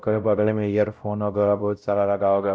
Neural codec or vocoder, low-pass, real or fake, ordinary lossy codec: codec, 16 kHz, 0.5 kbps, X-Codec, WavLM features, trained on Multilingual LibriSpeech; none; fake; none